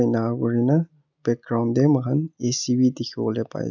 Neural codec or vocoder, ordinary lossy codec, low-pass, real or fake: none; none; 7.2 kHz; real